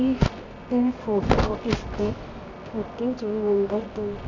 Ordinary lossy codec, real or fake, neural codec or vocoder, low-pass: none; fake; codec, 24 kHz, 0.9 kbps, WavTokenizer, medium music audio release; 7.2 kHz